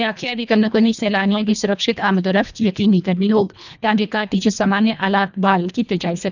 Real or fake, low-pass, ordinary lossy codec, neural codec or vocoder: fake; 7.2 kHz; none; codec, 24 kHz, 1.5 kbps, HILCodec